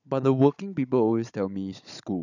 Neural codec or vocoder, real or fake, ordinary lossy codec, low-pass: codec, 16 kHz, 16 kbps, FunCodec, trained on Chinese and English, 50 frames a second; fake; none; 7.2 kHz